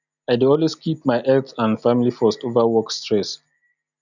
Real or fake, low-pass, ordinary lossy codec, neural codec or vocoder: real; 7.2 kHz; none; none